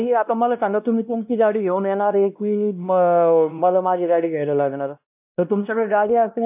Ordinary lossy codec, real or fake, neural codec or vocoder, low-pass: AAC, 32 kbps; fake; codec, 16 kHz, 1 kbps, X-Codec, WavLM features, trained on Multilingual LibriSpeech; 3.6 kHz